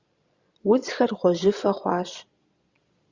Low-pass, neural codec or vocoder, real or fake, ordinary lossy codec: 7.2 kHz; vocoder, 22.05 kHz, 80 mel bands, Vocos; fake; Opus, 64 kbps